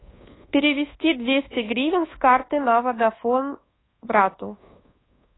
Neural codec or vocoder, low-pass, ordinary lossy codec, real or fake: codec, 24 kHz, 1.2 kbps, DualCodec; 7.2 kHz; AAC, 16 kbps; fake